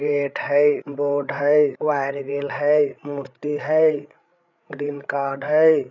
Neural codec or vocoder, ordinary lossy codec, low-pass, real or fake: codec, 16 kHz, 8 kbps, FreqCodec, larger model; none; 7.2 kHz; fake